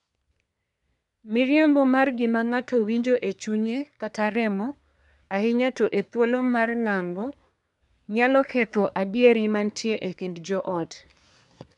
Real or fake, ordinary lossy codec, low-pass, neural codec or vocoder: fake; none; 10.8 kHz; codec, 24 kHz, 1 kbps, SNAC